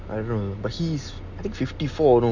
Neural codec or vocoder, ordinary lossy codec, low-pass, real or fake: none; none; 7.2 kHz; real